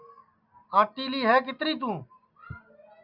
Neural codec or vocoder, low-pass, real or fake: none; 5.4 kHz; real